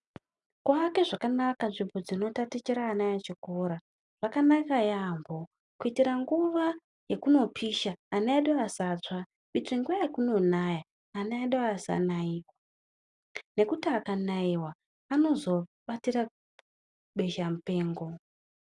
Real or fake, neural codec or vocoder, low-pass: real; none; 10.8 kHz